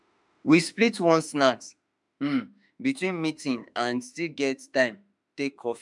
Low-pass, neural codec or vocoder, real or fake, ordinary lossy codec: 10.8 kHz; autoencoder, 48 kHz, 32 numbers a frame, DAC-VAE, trained on Japanese speech; fake; none